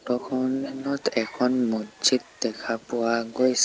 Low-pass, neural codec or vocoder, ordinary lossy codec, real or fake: none; none; none; real